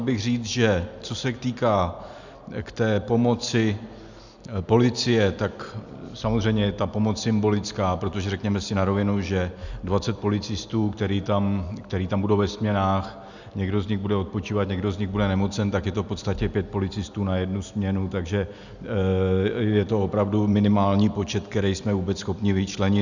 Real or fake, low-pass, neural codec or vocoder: real; 7.2 kHz; none